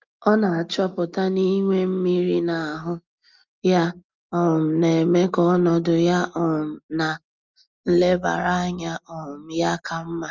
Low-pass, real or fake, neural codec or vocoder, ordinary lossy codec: 7.2 kHz; real; none; Opus, 32 kbps